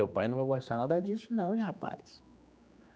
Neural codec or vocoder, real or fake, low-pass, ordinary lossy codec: codec, 16 kHz, 2 kbps, X-Codec, HuBERT features, trained on general audio; fake; none; none